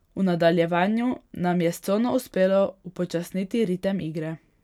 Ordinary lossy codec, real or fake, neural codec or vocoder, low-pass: none; real; none; 19.8 kHz